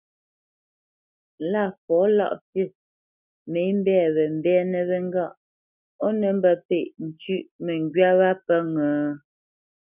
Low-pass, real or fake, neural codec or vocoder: 3.6 kHz; real; none